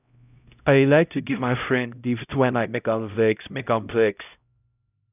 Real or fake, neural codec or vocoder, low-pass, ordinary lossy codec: fake; codec, 16 kHz, 0.5 kbps, X-Codec, HuBERT features, trained on LibriSpeech; 3.6 kHz; none